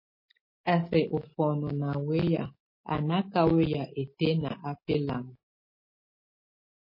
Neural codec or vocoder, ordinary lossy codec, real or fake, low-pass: none; MP3, 24 kbps; real; 5.4 kHz